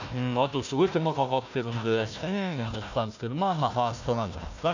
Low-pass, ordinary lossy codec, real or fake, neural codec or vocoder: 7.2 kHz; none; fake; codec, 16 kHz, 1 kbps, FunCodec, trained on Chinese and English, 50 frames a second